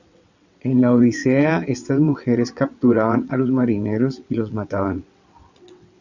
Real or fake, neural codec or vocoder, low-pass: fake; vocoder, 22.05 kHz, 80 mel bands, WaveNeXt; 7.2 kHz